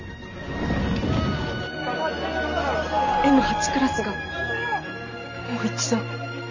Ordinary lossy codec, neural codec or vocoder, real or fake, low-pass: none; none; real; 7.2 kHz